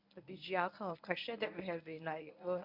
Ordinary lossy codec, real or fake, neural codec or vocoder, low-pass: MP3, 48 kbps; fake; codec, 24 kHz, 0.9 kbps, WavTokenizer, medium speech release version 1; 5.4 kHz